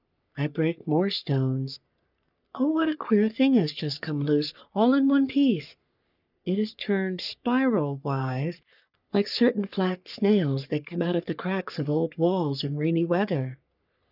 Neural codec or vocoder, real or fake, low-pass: codec, 44.1 kHz, 3.4 kbps, Pupu-Codec; fake; 5.4 kHz